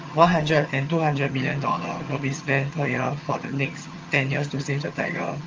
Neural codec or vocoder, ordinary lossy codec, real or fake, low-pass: vocoder, 22.05 kHz, 80 mel bands, HiFi-GAN; Opus, 24 kbps; fake; 7.2 kHz